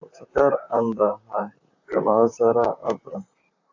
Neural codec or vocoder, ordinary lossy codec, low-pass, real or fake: vocoder, 44.1 kHz, 128 mel bands, Pupu-Vocoder; AAC, 48 kbps; 7.2 kHz; fake